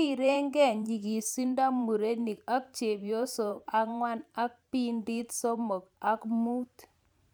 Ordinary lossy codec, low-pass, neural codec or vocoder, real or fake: none; none; vocoder, 44.1 kHz, 128 mel bands every 512 samples, BigVGAN v2; fake